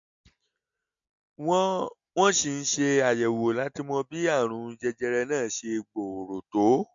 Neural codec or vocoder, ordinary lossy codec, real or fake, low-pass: none; MP3, 48 kbps; real; 7.2 kHz